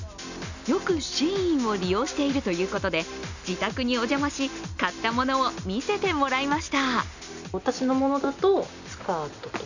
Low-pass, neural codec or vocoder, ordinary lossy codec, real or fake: 7.2 kHz; none; none; real